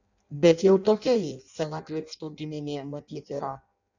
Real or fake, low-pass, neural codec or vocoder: fake; 7.2 kHz; codec, 16 kHz in and 24 kHz out, 0.6 kbps, FireRedTTS-2 codec